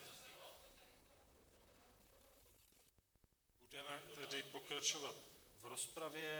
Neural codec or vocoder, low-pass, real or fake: vocoder, 44.1 kHz, 128 mel bands, Pupu-Vocoder; 19.8 kHz; fake